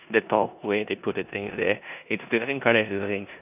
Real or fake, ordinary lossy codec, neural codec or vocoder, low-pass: fake; none; codec, 16 kHz in and 24 kHz out, 0.9 kbps, LongCat-Audio-Codec, four codebook decoder; 3.6 kHz